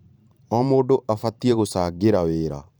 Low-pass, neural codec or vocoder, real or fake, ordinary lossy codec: none; vocoder, 44.1 kHz, 128 mel bands every 512 samples, BigVGAN v2; fake; none